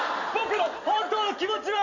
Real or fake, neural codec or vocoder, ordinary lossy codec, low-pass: real; none; none; 7.2 kHz